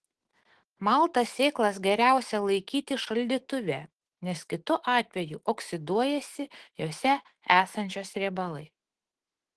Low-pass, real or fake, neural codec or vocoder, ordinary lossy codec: 10.8 kHz; fake; autoencoder, 48 kHz, 128 numbers a frame, DAC-VAE, trained on Japanese speech; Opus, 16 kbps